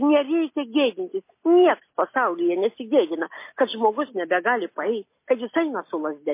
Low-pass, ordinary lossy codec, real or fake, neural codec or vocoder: 3.6 kHz; MP3, 24 kbps; real; none